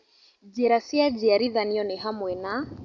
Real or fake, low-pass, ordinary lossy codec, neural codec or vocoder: real; 7.2 kHz; Opus, 64 kbps; none